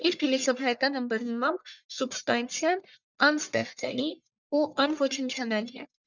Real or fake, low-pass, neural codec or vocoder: fake; 7.2 kHz; codec, 44.1 kHz, 1.7 kbps, Pupu-Codec